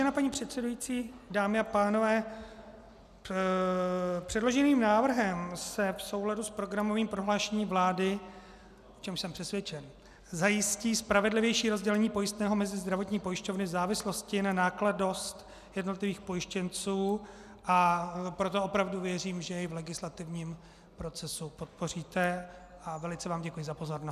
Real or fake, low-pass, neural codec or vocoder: real; 14.4 kHz; none